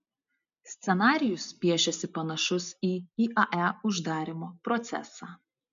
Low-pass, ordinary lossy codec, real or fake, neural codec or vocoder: 7.2 kHz; MP3, 64 kbps; real; none